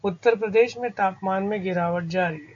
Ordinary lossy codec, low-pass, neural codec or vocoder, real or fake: AAC, 64 kbps; 7.2 kHz; none; real